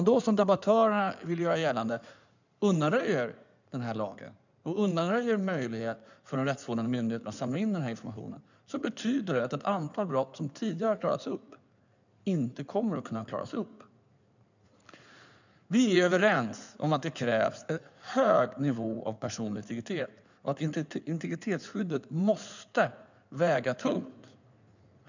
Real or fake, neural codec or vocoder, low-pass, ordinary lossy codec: fake; codec, 16 kHz in and 24 kHz out, 2.2 kbps, FireRedTTS-2 codec; 7.2 kHz; none